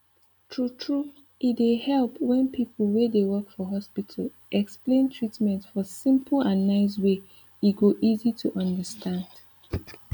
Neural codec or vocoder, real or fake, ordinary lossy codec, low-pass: none; real; none; none